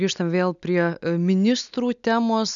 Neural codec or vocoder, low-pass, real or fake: none; 7.2 kHz; real